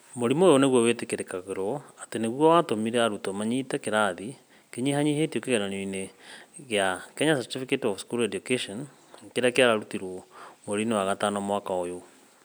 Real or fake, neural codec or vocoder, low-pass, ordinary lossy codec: real; none; none; none